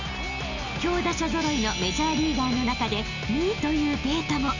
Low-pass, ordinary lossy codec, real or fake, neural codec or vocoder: 7.2 kHz; none; real; none